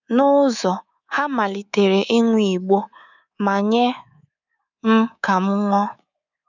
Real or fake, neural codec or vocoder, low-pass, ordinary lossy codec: fake; codec, 24 kHz, 3.1 kbps, DualCodec; 7.2 kHz; none